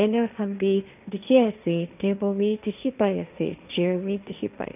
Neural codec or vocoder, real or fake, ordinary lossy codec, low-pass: codec, 16 kHz, 1.1 kbps, Voila-Tokenizer; fake; none; 3.6 kHz